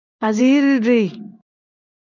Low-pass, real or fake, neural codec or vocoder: 7.2 kHz; fake; codec, 16 kHz in and 24 kHz out, 1 kbps, XY-Tokenizer